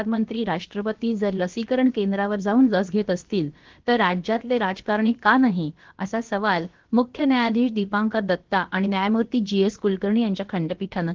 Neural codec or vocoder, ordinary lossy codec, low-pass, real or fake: codec, 16 kHz, about 1 kbps, DyCAST, with the encoder's durations; Opus, 16 kbps; 7.2 kHz; fake